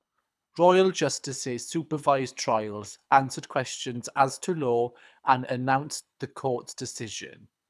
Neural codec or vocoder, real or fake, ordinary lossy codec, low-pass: codec, 24 kHz, 6 kbps, HILCodec; fake; none; none